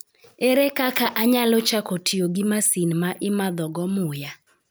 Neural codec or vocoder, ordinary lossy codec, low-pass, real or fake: none; none; none; real